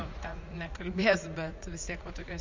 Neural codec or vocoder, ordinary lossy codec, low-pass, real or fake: vocoder, 44.1 kHz, 80 mel bands, Vocos; MP3, 64 kbps; 7.2 kHz; fake